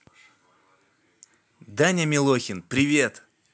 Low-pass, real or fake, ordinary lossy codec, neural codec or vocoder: none; real; none; none